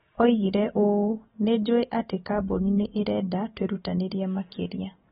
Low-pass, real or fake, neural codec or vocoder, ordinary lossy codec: 7.2 kHz; real; none; AAC, 16 kbps